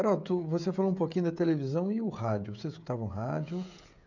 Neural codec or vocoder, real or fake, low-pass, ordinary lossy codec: codec, 16 kHz, 16 kbps, FreqCodec, smaller model; fake; 7.2 kHz; none